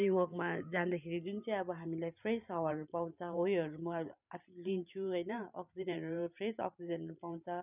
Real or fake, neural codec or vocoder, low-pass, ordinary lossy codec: fake; vocoder, 44.1 kHz, 128 mel bands every 512 samples, BigVGAN v2; 3.6 kHz; none